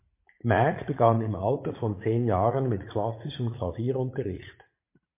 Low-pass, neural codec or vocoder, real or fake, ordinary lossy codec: 3.6 kHz; vocoder, 44.1 kHz, 80 mel bands, Vocos; fake; MP3, 24 kbps